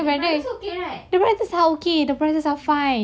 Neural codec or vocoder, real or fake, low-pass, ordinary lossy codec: none; real; none; none